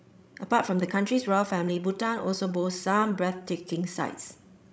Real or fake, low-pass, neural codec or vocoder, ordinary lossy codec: fake; none; codec, 16 kHz, 16 kbps, FreqCodec, larger model; none